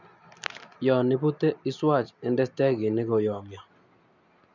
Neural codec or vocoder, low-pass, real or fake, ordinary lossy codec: none; 7.2 kHz; real; none